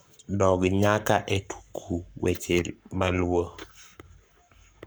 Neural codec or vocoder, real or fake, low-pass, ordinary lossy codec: codec, 44.1 kHz, 7.8 kbps, Pupu-Codec; fake; none; none